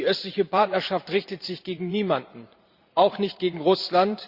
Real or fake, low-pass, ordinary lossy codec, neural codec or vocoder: fake; 5.4 kHz; Opus, 64 kbps; vocoder, 44.1 kHz, 128 mel bands every 512 samples, BigVGAN v2